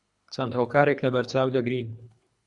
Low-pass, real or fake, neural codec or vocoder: 10.8 kHz; fake; codec, 24 kHz, 3 kbps, HILCodec